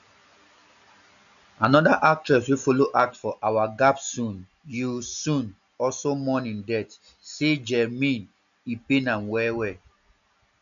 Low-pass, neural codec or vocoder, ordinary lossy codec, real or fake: 7.2 kHz; none; none; real